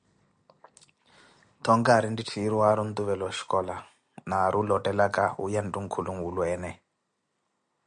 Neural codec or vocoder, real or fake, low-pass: none; real; 9.9 kHz